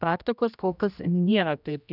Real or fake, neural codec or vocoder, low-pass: fake; codec, 16 kHz, 1 kbps, X-Codec, HuBERT features, trained on general audio; 5.4 kHz